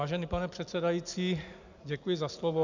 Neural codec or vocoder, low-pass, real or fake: none; 7.2 kHz; real